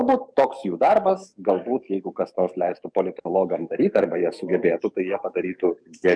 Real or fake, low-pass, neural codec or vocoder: fake; 9.9 kHz; codec, 44.1 kHz, 7.8 kbps, DAC